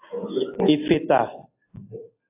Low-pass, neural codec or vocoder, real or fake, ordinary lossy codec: 3.6 kHz; none; real; AAC, 24 kbps